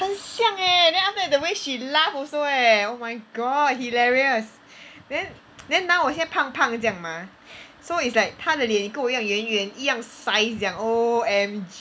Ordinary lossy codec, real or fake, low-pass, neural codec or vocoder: none; real; none; none